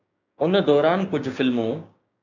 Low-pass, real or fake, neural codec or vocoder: 7.2 kHz; fake; codec, 16 kHz, 6 kbps, DAC